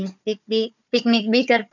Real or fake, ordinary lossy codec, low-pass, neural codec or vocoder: fake; none; 7.2 kHz; codec, 16 kHz, 4 kbps, FunCodec, trained on Chinese and English, 50 frames a second